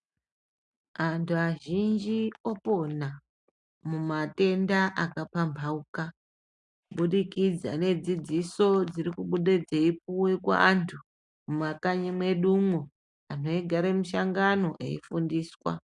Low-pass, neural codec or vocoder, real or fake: 10.8 kHz; none; real